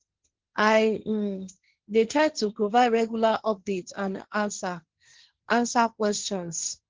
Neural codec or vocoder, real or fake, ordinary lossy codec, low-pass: codec, 16 kHz, 1.1 kbps, Voila-Tokenizer; fake; Opus, 16 kbps; 7.2 kHz